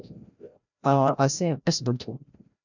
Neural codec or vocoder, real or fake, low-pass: codec, 16 kHz, 0.5 kbps, FreqCodec, larger model; fake; 7.2 kHz